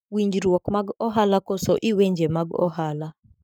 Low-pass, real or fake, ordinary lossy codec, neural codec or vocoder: none; fake; none; codec, 44.1 kHz, 7.8 kbps, Pupu-Codec